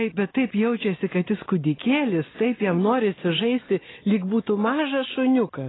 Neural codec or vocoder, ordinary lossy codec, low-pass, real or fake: none; AAC, 16 kbps; 7.2 kHz; real